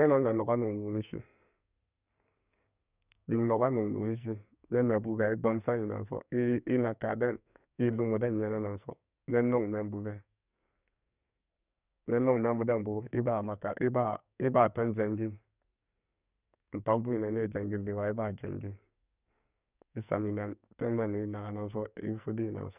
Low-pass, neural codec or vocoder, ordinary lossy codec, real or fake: 3.6 kHz; codec, 44.1 kHz, 2.6 kbps, SNAC; none; fake